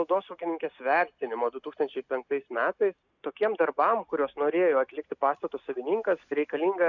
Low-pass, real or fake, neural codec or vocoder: 7.2 kHz; real; none